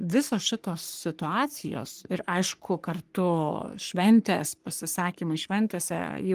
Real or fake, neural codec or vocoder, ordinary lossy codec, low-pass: fake; codec, 44.1 kHz, 3.4 kbps, Pupu-Codec; Opus, 24 kbps; 14.4 kHz